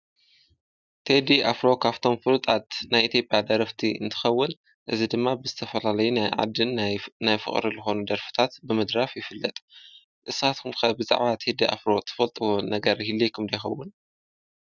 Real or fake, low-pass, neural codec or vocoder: real; 7.2 kHz; none